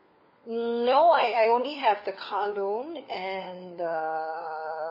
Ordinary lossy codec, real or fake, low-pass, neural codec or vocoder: MP3, 24 kbps; fake; 5.4 kHz; codec, 16 kHz, 4 kbps, FunCodec, trained on LibriTTS, 50 frames a second